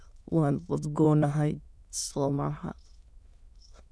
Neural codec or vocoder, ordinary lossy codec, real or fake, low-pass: autoencoder, 22.05 kHz, a latent of 192 numbers a frame, VITS, trained on many speakers; none; fake; none